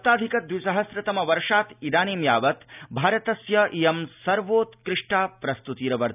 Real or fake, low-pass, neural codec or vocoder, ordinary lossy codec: real; 3.6 kHz; none; none